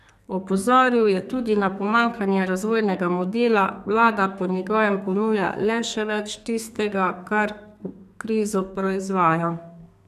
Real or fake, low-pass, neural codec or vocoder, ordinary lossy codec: fake; 14.4 kHz; codec, 32 kHz, 1.9 kbps, SNAC; none